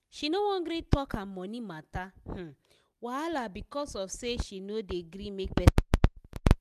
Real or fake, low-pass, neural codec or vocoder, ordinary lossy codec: real; 14.4 kHz; none; none